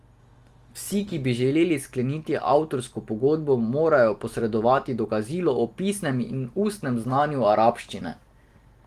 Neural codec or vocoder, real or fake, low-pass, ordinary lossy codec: none; real; 14.4 kHz; Opus, 24 kbps